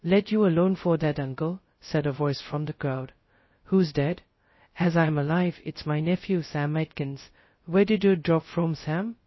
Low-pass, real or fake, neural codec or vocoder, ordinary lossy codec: 7.2 kHz; fake; codec, 16 kHz, 0.2 kbps, FocalCodec; MP3, 24 kbps